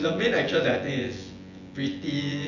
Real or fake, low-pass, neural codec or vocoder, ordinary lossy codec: fake; 7.2 kHz; vocoder, 24 kHz, 100 mel bands, Vocos; none